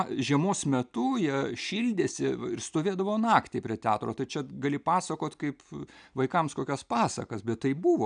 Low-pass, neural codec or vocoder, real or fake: 9.9 kHz; none; real